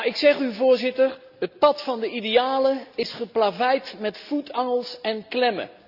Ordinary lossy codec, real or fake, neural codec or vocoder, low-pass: Opus, 64 kbps; real; none; 5.4 kHz